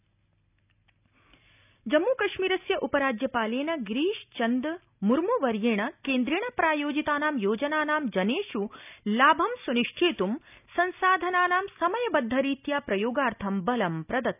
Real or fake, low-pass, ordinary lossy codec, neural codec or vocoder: real; 3.6 kHz; none; none